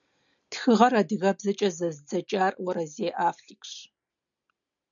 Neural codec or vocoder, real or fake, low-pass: none; real; 7.2 kHz